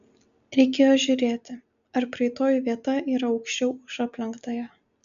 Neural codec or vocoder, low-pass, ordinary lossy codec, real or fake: none; 7.2 kHz; MP3, 96 kbps; real